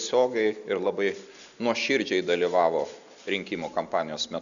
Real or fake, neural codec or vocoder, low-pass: real; none; 7.2 kHz